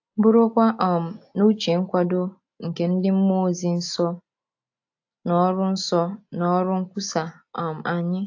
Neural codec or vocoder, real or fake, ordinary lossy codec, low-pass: none; real; AAC, 48 kbps; 7.2 kHz